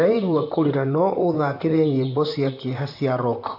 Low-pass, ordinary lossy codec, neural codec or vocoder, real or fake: 5.4 kHz; AAC, 48 kbps; vocoder, 44.1 kHz, 128 mel bands, Pupu-Vocoder; fake